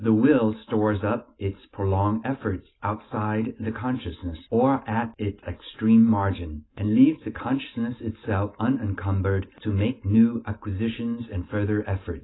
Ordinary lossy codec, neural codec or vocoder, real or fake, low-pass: AAC, 16 kbps; none; real; 7.2 kHz